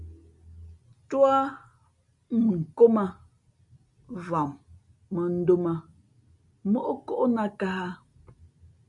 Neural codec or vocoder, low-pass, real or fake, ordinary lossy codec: none; 10.8 kHz; real; MP3, 96 kbps